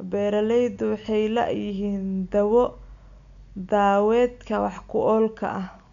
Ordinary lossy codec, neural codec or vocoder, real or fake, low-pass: none; none; real; 7.2 kHz